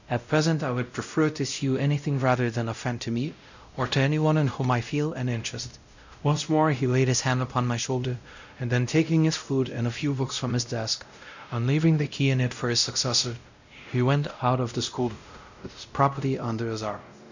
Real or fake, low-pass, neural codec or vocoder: fake; 7.2 kHz; codec, 16 kHz, 0.5 kbps, X-Codec, WavLM features, trained on Multilingual LibriSpeech